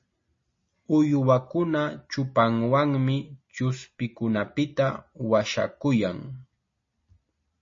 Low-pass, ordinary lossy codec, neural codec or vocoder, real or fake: 7.2 kHz; MP3, 32 kbps; none; real